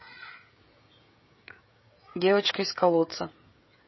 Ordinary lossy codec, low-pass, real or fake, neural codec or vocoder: MP3, 24 kbps; 7.2 kHz; fake; codec, 16 kHz, 16 kbps, FreqCodec, smaller model